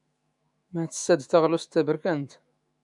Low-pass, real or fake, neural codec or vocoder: 10.8 kHz; fake; autoencoder, 48 kHz, 128 numbers a frame, DAC-VAE, trained on Japanese speech